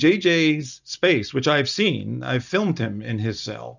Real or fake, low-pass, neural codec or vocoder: real; 7.2 kHz; none